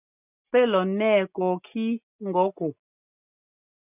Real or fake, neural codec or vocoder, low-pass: real; none; 3.6 kHz